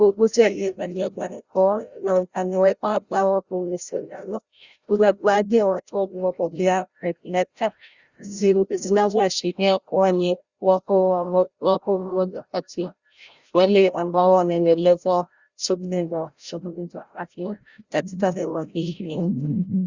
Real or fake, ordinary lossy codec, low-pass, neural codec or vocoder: fake; Opus, 64 kbps; 7.2 kHz; codec, 16 kHz, 0.5 kbps, FreqCodec, larger model